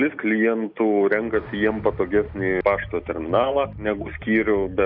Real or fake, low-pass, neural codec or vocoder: real; 5.4 kHz; none